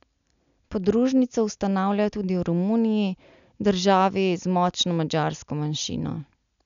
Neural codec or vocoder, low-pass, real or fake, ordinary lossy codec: none; 7.2 kHz; real; none